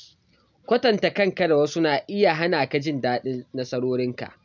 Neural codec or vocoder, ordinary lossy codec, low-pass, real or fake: none; none; 7.2 kHz; real